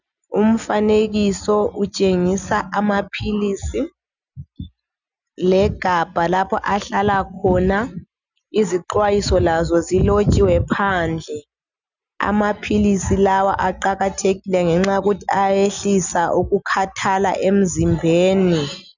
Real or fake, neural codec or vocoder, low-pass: real; none; 7.2 kHz